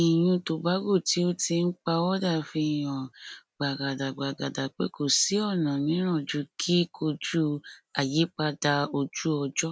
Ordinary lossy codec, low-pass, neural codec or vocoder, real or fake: none; none; none; real